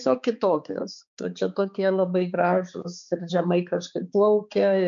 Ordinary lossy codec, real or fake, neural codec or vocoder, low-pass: MP3, 64 kbps; fake; codec, 16 kHz, 4 kbps, X-Codec, HuBERT features, trained on balanced general audio; 7.2 kHz